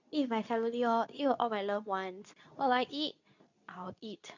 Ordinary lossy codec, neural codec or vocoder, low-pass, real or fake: none; codec, 24 kHz, 0.9 kbps, WavTokenizer, medium speech release version 2; 7.2 kHz; fake